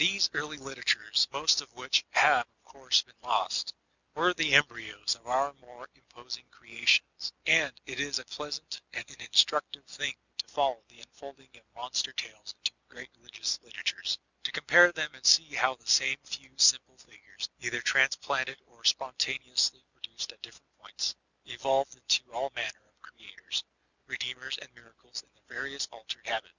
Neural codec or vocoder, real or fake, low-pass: none; real; 7.2 kHz